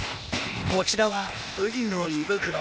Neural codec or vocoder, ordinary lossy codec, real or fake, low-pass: codec, 16 kHz, 0.8 kbps, ZipCodec; none; fake; none